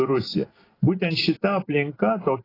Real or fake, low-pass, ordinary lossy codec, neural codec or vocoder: real; 5.4 kHz; AAC, 24 kbps; none